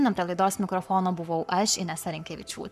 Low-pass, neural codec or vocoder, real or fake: 14.4 kHz; codec, 44.1 kHz, 7.8 kbps, Pupu-Codec; fake